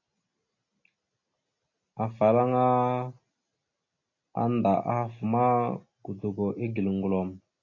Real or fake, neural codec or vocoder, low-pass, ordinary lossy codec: real; none; 7.2 kHz; AAC, 32 kbps